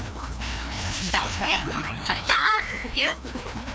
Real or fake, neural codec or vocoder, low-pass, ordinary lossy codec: fake; codec, 16 kHz, 1 kbps, FreqCodec, larger model; none; none